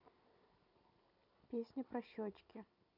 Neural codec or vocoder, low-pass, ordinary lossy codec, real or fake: vocoder, 44.1 kHz, 128 mel bands every 256 samples, BigVGAN v2; 5.4 kHz; none; fake